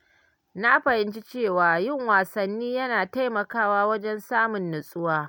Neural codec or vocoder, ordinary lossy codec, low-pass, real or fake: none; none; 19.8 kHz; real